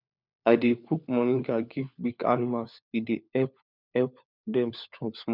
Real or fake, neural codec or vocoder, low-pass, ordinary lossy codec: fake; codec, 16 kHz, 4 kbps, FunCodec, trained on LibriTTS, 50 frames a second; 5.4 kHz; none